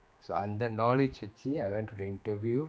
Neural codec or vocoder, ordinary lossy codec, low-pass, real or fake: codec, 16 kHz, 2 kbps, X-Codec, HuBERT features, trained on general audio; none; none; fake